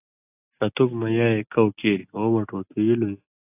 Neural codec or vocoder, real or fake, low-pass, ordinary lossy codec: none; real; 3.6 kHz; AAC, 32 kbps